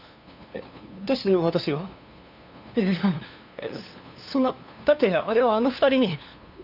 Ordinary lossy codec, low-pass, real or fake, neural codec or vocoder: none; 5.4 kHz; fake; codec, 16 kHz, 2 kbps, FunCodec, trained on LibriTTS, 25 frames a second